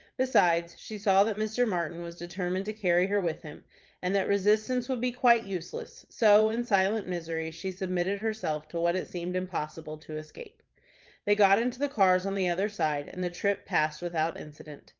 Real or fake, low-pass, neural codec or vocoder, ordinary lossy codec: fake; 7.2 kHz; vocoder, 22.05 kHz, 80 mel bands, Vocos; Opus, 24 kbps